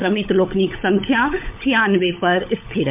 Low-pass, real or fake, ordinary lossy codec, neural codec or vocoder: 3.6 kHz; fake; MP3, 32 kbps; codec, 16 kHz, 16 kbps, FunCodec, trained on LibriTTS, 50 frames a second